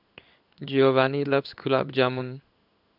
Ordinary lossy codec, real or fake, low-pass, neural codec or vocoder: none; fake; 5.4 kHz; codec, 16 kHz in and 24 kHz out, 1 kbps, XY-Tokenizer